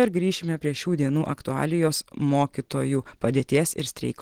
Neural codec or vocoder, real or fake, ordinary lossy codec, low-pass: none; real; Opus, 16 kbps; 19.8 kHz